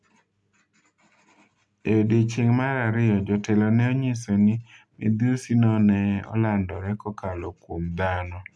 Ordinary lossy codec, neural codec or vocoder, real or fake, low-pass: none; none; real; none